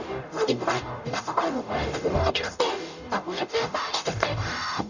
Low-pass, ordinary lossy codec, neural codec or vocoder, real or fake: 7.2 kHz; none; codec, 44.1 kHz, 0.9 kbps, DAC; fake